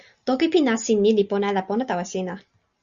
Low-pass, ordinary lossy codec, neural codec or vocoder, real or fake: 7.2 kHz; Opus, 64 kbps; none; real